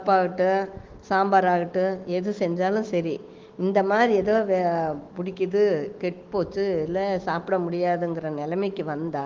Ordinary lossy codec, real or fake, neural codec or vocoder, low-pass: Opus, 16 kbps; fake; autoencoder, 48 kHz, 128 numbers a frame, DAC-VAE, trained on Japanese speech; 7.2 kHz